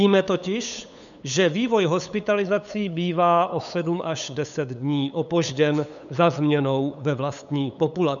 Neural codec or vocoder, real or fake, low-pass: codec, 16 kHz, 8 kbps, FunCodec, trained on LibriTTS, 25 frames a second; fake; 7.2 kHz